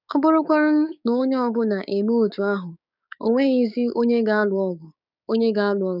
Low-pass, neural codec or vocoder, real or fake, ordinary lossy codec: 5.4 kHz; codec, 16 kHz, 6 kbps, DAC; fake; none